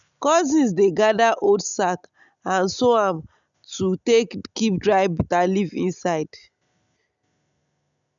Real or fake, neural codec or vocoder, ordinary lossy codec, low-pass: real; none; none; 7.2 kHz